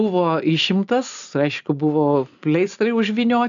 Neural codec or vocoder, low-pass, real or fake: none; 7.2 kHz; real